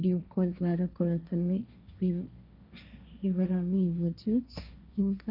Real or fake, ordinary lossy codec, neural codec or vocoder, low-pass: fake; none; codec, 16 kHz, 1.1 kbps, Voila-Tokenizer; 5.4 kHz